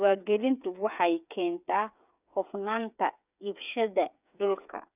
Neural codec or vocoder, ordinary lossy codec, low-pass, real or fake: codec, 16 kHz, 8 kbps, FreqCodec, smaller model; none; 3.6 kHz; fake